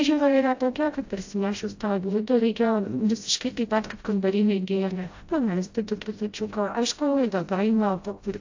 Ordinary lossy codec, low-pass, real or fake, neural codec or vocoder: AAC, 48 kbps; 7.2 kHz; fake; codec, 16 kHz, 0.5 kbps, FreqCodec, smaller model